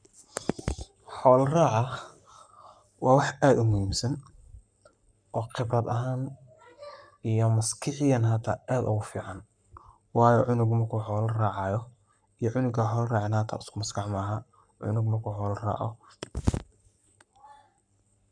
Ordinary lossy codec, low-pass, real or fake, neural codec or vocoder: none; 9.9 kHz; fake; codec, 44.1 kHz, 7.8 kbps, Pupu-Codec